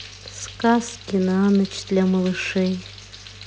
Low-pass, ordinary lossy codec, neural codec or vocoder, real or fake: none; none; none; real